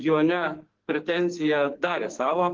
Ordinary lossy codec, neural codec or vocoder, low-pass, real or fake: Opus, 16 kbps; codec, 44.1 kHz, 2.6 kbps, SNAC; 7.2 kHz; fake